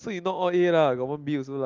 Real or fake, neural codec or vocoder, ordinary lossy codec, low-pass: real; none; Opus, 32 kbps; 7.2 kHz